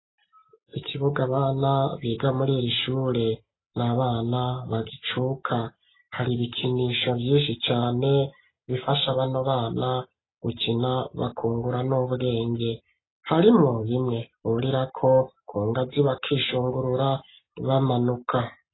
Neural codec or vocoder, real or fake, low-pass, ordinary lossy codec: none; real; 7.2 kHz; AAC, 16 kbps